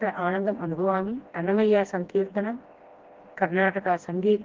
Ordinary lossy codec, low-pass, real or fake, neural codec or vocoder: Opus, 16 kbps; 7.2 kHz; fake; codec, 16 kHz, 1 kbps, FreqCodec, smaller model